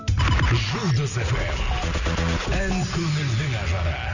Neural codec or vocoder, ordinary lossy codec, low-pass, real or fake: none; none; 7.2 kHz; real